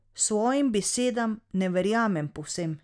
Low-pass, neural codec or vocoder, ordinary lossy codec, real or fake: 9.9 kHz; none; none; real